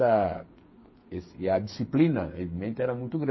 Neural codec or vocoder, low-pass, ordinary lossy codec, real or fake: codec, 16 kHz, 8 kbps, FreqCodec, smaller model; 7.2 kHz; MP3, 24 kbps; fake